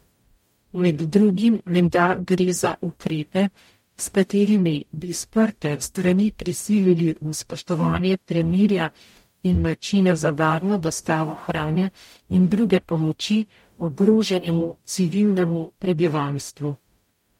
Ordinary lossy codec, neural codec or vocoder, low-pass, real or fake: MP3, 64 kbps; codec, 44.1 kHz, 0.9 kbps, DAC; 19.8 kHz; fake